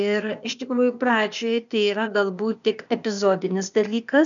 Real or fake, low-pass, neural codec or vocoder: fake; 7.2 kHz; codec, 16 kHz, 0.8 kbps, ZipCodec